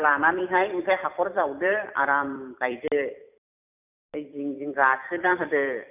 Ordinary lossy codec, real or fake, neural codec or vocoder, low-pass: none; fake; vocoder, 44.1 kHz, 128 mel bands every 256 samples, BigVGAN v2; 3.6 kHz